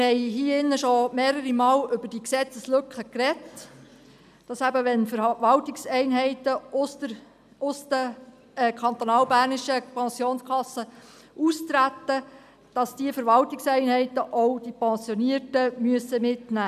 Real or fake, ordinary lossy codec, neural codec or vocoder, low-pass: real; none; none; 14.4 kHz